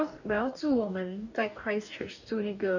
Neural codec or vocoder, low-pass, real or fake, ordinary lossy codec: codec, 44.1 kHz, 2.6 kbps, DAC; 7.2 kHz; fake; none